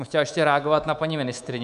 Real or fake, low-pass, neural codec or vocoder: fake; 10.8 kHz; codec, 24 kHz, 3.1 kbps, DualCodec